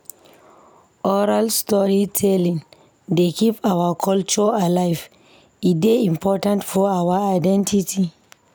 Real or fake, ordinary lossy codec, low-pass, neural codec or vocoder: real; none; none; none